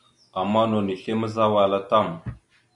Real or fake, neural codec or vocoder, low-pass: real; none; 10.8 kHz